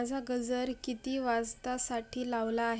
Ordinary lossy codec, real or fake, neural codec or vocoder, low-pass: none; real; none; none